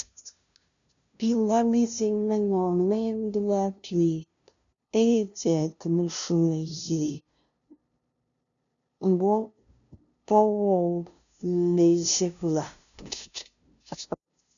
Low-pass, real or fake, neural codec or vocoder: 7.2 kHz; fake; codec, 16 kHz, 0.5 kbps, FunCodec, trained on LibriTTS, 25 frames a second